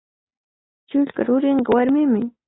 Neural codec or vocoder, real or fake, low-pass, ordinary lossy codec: none; real; 7.2 kHz; AAC, 16 kbps